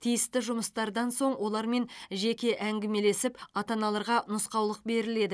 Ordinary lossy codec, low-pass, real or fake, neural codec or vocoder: none; none; real; none